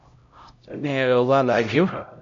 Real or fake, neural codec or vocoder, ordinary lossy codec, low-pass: fake; codec, 16 kHz, 0.5 kbps, X-Codec, HuBERT features, trained on LibriSpeech; MP3, 48 kbps; 7.2 kHz